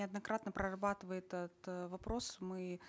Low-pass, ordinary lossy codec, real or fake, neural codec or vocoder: none; none; real; none